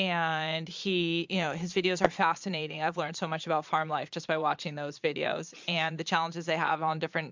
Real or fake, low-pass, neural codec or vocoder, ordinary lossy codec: fake; 7.2 kHz; vocoder, 22.05 kHz, 80 mel bands, Vocos; MP3, 64 kbps